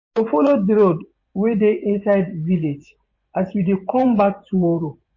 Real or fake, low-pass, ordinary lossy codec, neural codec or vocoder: fake; 7.2 kHz; MP3, 32 kbps; vocoder, 24 kHz, 100 mel bands, Vocos